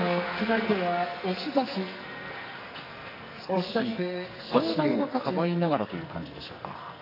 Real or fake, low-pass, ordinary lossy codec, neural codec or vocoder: fake; 5.4 kHz; AAC, 32 kbps; codec, 44.1 kHz, 2.6 kbps, SNAC